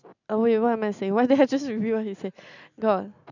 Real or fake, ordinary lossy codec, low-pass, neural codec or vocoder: real; none; 7.2 kHz; none